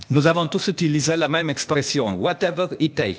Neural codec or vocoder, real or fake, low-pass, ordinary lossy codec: codec, 16 kHz, 0.8 kbps, ZipCodec; fake; none; none